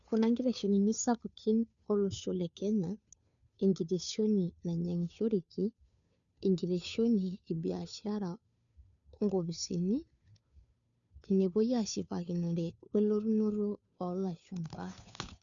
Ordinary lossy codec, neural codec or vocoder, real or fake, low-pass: none; codec, 16 kHz, 2 kbps, FunCodec, trained on Chinese and English, 25 frames a second; fake; 7.2 kHz